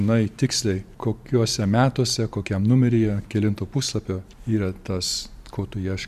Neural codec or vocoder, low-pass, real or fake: vocoder, 44.1 kHz, 128 mel bands every 512 samples, BigVGAN v2; 14.4 kHz; fake